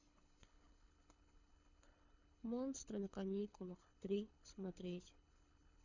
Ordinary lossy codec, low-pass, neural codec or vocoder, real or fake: none; 7.2 kHz; codec, 24 kHz, 6 kbps, HILCodec; fake